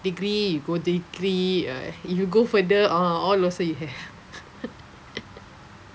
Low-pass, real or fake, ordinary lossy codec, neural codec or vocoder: none; real; none; none